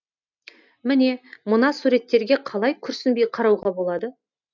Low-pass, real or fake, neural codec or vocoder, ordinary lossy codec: 7.2 kHz; real; none; none